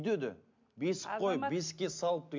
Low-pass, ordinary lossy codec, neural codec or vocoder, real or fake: 7.2 kHz; MP3, 64 kbps; none; real